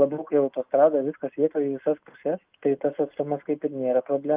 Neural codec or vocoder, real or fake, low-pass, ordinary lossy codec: none; real; 3.6 kHz; Opus, 24 kbps